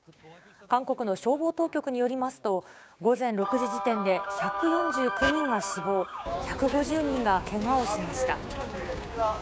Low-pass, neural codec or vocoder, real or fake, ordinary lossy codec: none; codec, 16 kHz, 6 kbps, DAC; fake; none